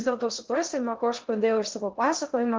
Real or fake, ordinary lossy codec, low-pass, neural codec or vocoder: fake; Opus, 16 kbps; 7.2 kHz; codec, 16 kHz in and 24 kHz out, 0.6 kbps, FocalCodec, streaming, 2048 codes